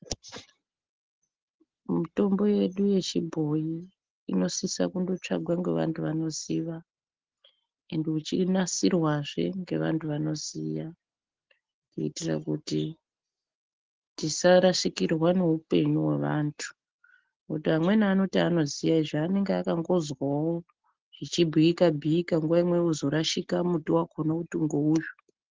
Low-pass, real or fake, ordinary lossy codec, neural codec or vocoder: 7.2 kHz; real; Opus, 16 kbps; none